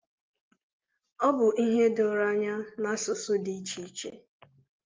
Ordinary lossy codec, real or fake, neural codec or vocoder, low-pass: Opus, 24 kbps; real; none; 7.2 kHz